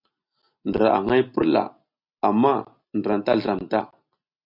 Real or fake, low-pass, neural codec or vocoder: real; 5.4 kHz; none